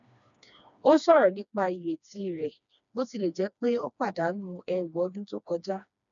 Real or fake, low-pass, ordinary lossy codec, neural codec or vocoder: fake; 7.2 kHz; none; codec, 16 kHz, 2 kbps, FreqCodec, smaller model